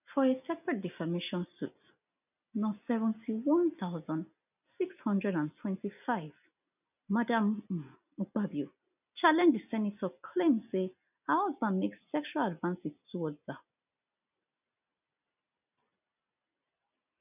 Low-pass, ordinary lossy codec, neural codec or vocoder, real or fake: 3.6 kHz; none; none; real